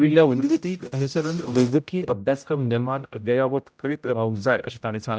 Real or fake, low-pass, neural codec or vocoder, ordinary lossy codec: fake; none; codec, 16 kHz, 0.5 kbps, X-Codec, HuBERT features, trained on general audio; none